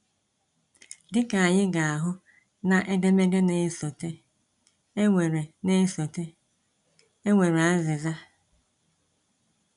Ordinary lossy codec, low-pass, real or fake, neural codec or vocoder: none; 10.8 kHz; real; none